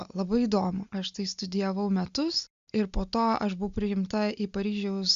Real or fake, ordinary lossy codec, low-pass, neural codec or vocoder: real; Opus, 64 kbps; 7.2 kHz; none